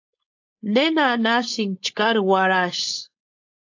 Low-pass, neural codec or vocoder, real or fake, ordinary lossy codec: 7.2 kHz; codec, 16 kHz, 4.8 kbps, FACodec; fake; AAC, 48 kbps